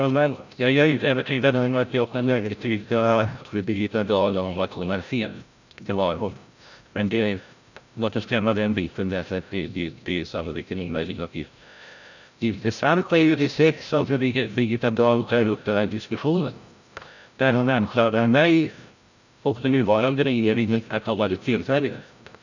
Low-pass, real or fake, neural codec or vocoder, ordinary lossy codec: 7.2 kHz; fake; codec, 16 kHz, 0.5 kbps, FreqCodec, larger model; none